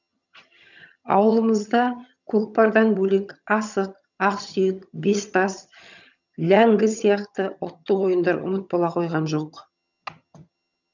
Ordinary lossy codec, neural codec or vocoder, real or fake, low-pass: none; vocoder, 22.05 kHz, 80 mel bands, HiFi-GAN; fake; 7.2 kHz